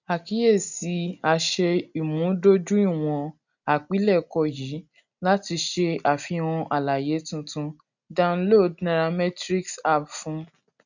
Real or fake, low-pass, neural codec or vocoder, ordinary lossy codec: real; 7.2 kHz; none; none